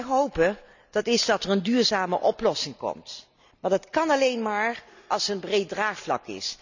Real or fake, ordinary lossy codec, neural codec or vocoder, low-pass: real; none; none; 7.2 kHz